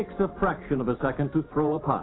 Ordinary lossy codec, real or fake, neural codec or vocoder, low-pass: AAC, 16 kbps; fake; vocoder, 44.1 kHz, 128 mel bands every 512 samples, BigVGAN v2; 7.2 kHz